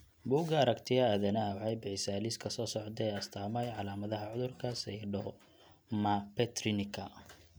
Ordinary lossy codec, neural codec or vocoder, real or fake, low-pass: none; none; real; none